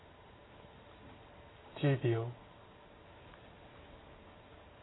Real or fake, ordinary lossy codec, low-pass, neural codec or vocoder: real; AAC, 16 kbps; 7.2 kHz; none